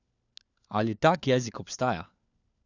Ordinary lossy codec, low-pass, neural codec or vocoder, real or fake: none; 7.2 kHz; codec, 16 kHz, 4 kbps, FunCodec, trained on LibriTTS, 50 frames a second; fake